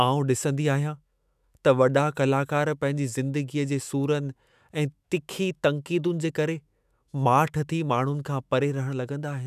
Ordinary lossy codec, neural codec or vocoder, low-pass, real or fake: none; autoencoder, 48 kHz, 128 numbers a frame, DAC-VAE, trained on Japanese speech; 14.4 kHz; fake